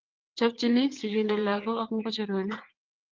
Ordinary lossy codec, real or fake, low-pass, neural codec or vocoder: Opus, 32 kbps; fake; 7.2 kHz; vocoder, 22.05 kHz, 80 mel bands, WaveNeXt